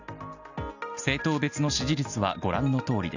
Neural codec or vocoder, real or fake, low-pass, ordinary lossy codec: none; real; 7.2 kHz; none